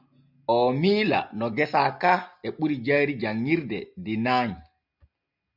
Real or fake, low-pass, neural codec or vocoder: real; 5.4 kHz; none